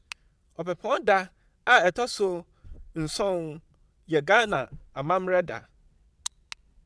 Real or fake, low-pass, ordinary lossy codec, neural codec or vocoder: fake; none; none; vocoder, 22.05 kHz, 80 mel bands, WaveNeXt